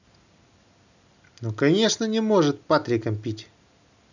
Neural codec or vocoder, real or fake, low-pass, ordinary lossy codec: none; real; 7.2 kHz; none